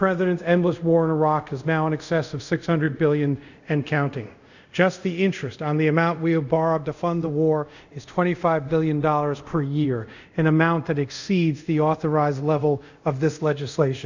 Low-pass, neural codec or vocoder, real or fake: 7.2 kHz; codec, 24 kHz, 0.5 kbps, DualCodec; fake